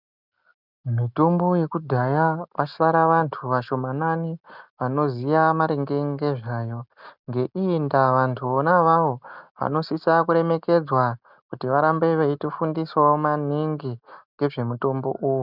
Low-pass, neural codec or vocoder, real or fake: 5.4 kHz; none; real